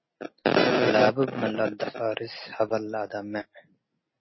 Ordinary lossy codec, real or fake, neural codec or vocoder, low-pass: MP3, 24 kbps; real; none; 7.2 kHz